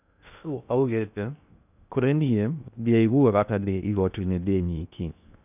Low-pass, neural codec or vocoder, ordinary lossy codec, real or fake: 3.6 kHz; codec, 16 kHz in and 24 kHz out, 0.6 kbps, FocalCodec, streaming, 2048 codes; none; fake